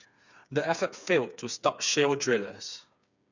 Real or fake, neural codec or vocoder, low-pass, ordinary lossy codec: fake; codec, 16 kHz, 4 kbps, FreqCodec, smaller model; 7.2 kHz; none